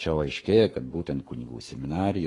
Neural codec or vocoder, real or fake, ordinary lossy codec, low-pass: codec, 44.1 kHz, 7.8 kbps, Pupu-Codec; fake; AAC, 32 kbps; 10.8 kHz